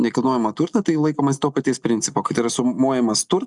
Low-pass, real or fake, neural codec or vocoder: 10.8 kHz; real; none